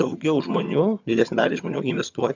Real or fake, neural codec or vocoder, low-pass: fake; vocoder, 22.05 kHz, 80 mel bands, HiFi-GAN; 7.2 kHz